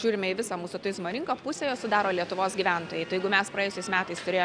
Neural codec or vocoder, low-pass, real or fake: none; 9.9 kHz; real